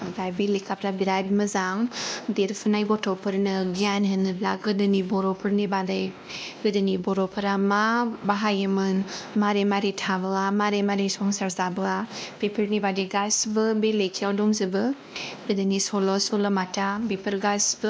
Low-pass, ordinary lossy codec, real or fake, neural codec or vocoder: none; none; fake; codec, 16 kHz, 1 kbps, X-Codec, WavLM features, trained on Multilingual LibriSpeech